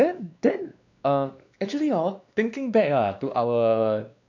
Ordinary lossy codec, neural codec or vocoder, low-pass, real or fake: none; codec, 16 kHz, 2 kbps, X-Codec, WavLM features, trained on Multilingual LibriSpeech; 7.2 kHz; fake